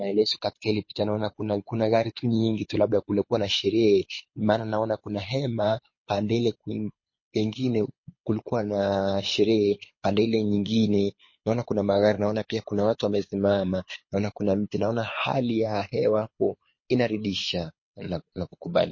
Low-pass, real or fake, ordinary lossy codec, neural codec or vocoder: 7.2 kHz; fake; MP3, 32 kbps; codec, 24 kHz, 6 kbps, HILCodec